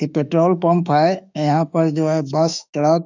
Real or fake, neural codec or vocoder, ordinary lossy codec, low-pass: fake; autoencoder, 48 kHz, 32 numbers a frame, DAC-VAE, trained on Japanese speech; none; 7.2 kHz